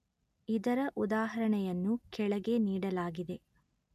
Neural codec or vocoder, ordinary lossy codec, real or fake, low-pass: none; none; real; 14.4 kHz